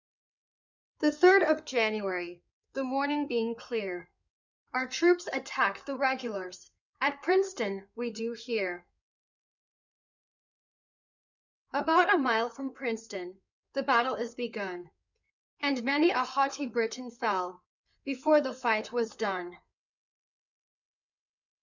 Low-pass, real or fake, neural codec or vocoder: 7.2 kHz; fake; codec, 16 kHz in and 24 kHz out, 2.2 kbps, FireRedTTS-2 codec